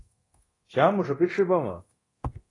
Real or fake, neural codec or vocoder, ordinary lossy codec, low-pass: fake; codec, 24 kHz, 0.9 kbps, DualCodec; AAC, 32 kbps; 10.8 kHz